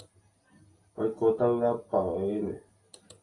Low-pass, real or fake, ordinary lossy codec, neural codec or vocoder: 10.8 kHz; real; AAC, 32 kbps; none